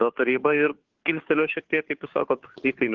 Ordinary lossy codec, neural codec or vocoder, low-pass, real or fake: Opus, 16 kbps; codec, 16 kHz, 2 kbps, FunCodec, trained on Chinese and English, 25 frames a second; 7.2 kHz; fake